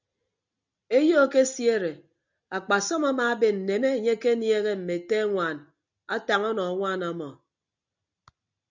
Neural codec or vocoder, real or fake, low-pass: none; real; 7.2 kHz